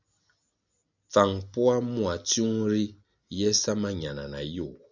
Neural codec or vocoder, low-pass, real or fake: none; 7.2 kHz; real